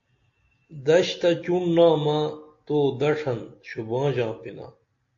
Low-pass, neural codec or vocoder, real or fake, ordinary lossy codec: 7.2 kHz; none; real; AAC, 48 kbps